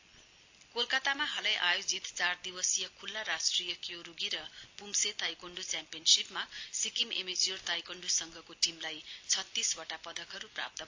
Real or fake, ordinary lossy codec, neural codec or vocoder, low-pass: real; AAC, 48 kbps; none; 7.2 kHz